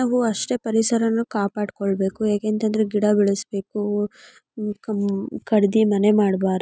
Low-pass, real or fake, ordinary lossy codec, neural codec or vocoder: none; real; none; none